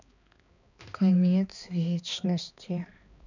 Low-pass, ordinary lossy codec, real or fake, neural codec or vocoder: 7.2 kHz; none; fake; codec, 16 kHz, 2 kbps, X-Codec, HuBERT features, trained on balanced general audio